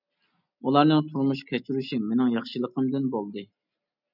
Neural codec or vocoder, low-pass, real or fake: none; 5.4 kHz; real